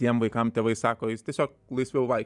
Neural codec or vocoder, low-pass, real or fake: vocoder, 44.1 kHz, 128 mel bands every 512 samples, BigVGAN v2; 10.8 kHz; fake